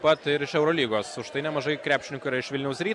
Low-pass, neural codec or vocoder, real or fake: 10.8 kHz; none; real